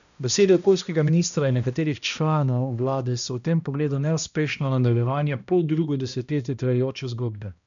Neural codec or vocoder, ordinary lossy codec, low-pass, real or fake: codec, 16 kHz, 1 kbps, X-Codec, HuBERT features, trained on balanced general audio; none; 7.2 kHz; fake